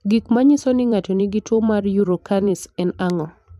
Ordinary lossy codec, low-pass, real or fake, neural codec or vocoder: MP3, 96 kbps; 14.4 kHz; fake; vocoder, 44.1 kHz, 128 mel bands every 256 samples, BigVGAN v2